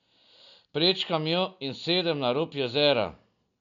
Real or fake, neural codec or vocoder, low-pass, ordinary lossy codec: real; none; 7.2 kHz; none